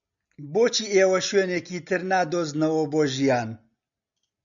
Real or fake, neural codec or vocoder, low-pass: real; none; 7.2 kHz